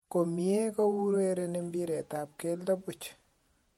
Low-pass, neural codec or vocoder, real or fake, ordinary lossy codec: 19.8 kHz; vocoder, 48 kHz, 128 mel bands, Vocos; fake; MP3, 64 kbps